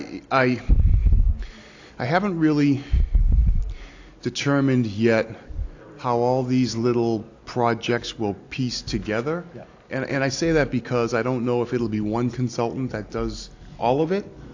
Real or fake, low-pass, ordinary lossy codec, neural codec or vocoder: real; 7.2 kHz; AAC, 48 kbps; none